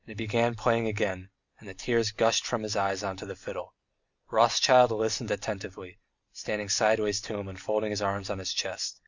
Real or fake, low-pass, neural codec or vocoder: real; 7.2 kHz; none